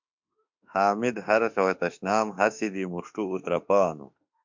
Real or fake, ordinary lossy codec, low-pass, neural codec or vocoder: fake; MP3, 64 kbps; 7.2 kHz; autoencoder, 48 kHz, 32 numbers a frame, DAC-VAE, trained on Japanese speech